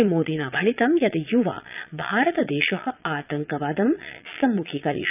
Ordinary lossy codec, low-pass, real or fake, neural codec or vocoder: none; 3.6 kHz; fake; vocoder, 22.05 kHz, 80 mel bands, Vocos